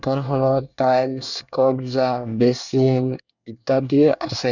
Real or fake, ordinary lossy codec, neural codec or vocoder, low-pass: fake; none; codec, 24 kHz, 1 kbps, SNAC; 7.2 kHz